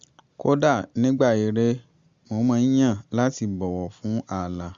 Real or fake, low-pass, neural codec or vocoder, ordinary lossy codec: real; 7.2 kHz; none; Opus, 64 kbps